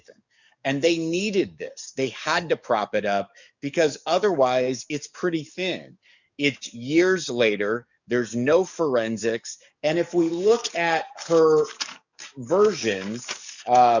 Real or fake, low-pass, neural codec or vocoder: fake; 7.2 kHz; codec, 44.1 kHz, 7.8 kbps, DAC